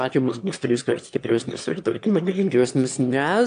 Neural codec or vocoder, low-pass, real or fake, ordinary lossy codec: autoencoder, 22.05 kHz, a latent of 192 numbers a frame, VITS, trained on one speaker; 9.9 kHz; fake; AAC, 64 kbps